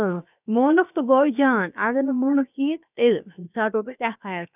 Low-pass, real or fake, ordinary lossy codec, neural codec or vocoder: 3.6 kHz; fake; none; codec, 16 kHz, 0.7 kbps, FocalCodec